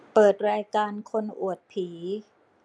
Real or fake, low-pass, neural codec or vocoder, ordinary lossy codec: real; 9.9 kHz; none; none